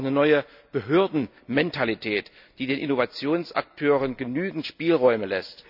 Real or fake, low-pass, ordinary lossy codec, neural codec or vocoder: real; 5.4 kHz; none; none